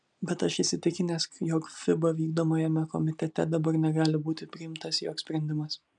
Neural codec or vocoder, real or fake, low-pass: none; real; 9.9 kHz